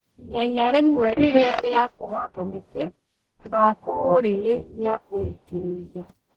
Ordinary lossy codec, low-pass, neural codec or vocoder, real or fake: Opus, 16 kbps; 19.8 kHz; codec, 44.1 kHz, 0.9 kbps, DAC; fake